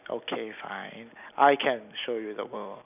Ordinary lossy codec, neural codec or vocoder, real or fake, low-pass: none; none; real; 3.6 kHz